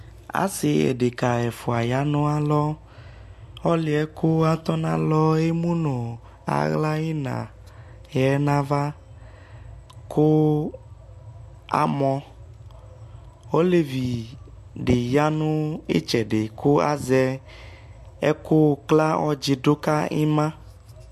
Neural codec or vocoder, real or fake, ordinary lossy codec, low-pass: none; real; MP3, 64 kbps; 14.4 kHz